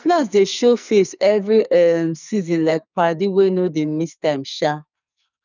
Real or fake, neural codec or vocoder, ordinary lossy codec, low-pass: fake; codec, 44.1 kHz, 2.6 kbps, SNAC; none; 7.2 kHz